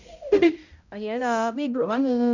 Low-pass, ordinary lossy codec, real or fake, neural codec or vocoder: 7.2 kHz; none; fake; codec, 16 kHz, 0.5 kbps, X-Codec, HuBERT features, trained on balanced general audio